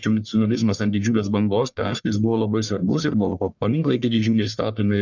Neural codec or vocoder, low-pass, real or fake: codec, 44.1 kHz, 1.7 kbps, Pupu-Codec; 7.2 kHz; fake